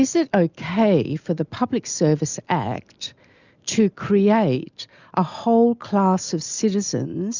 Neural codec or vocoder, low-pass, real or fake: none; 7.2 kHz; real